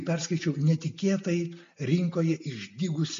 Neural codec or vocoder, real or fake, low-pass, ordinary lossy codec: none; real; 7.2 kHz; MP3, 48 kbps